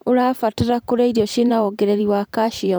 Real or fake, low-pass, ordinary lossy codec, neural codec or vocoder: fake; none; none; vocoder, 44.1 kHz, 128 mel bands every 512 samples, BigVGAN v2